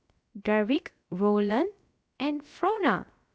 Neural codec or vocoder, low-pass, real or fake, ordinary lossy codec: codec, 16 kHz, 0.7 kbps, FocalCodec; none; fake; none